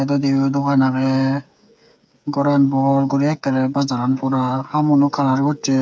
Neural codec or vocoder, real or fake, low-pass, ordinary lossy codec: codec, 16 kHz, 8 kbps, FreqCodec, smaller model; fake; none; none